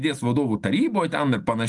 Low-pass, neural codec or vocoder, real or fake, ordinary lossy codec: 10.8 kHz; none; real; Opus, 32 kbps